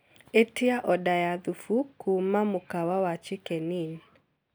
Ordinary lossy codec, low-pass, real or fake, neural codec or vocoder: none; none; real; none